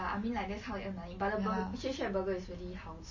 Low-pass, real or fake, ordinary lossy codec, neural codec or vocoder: 7.2 kHz; real; MP3, 32 kbps; none